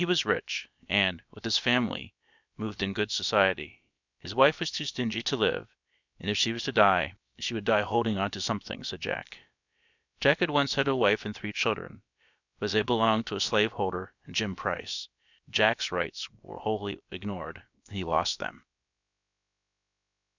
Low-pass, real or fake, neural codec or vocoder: 7.2 kHz; fake; codec, 16 kHz, about 1 kbps, DyCAST, with the encoder's durations